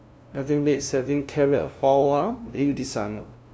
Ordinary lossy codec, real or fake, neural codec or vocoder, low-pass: none; fake; codec, 16 kHz, 0.5 kbps, FunCodec, trained on LibriTTS, 25 frames a second; none